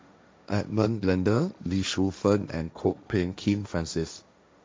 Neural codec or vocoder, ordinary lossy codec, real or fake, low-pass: codec, 16 kHz, 1.1 kbps, Voila-Tokenizer; none; fake; none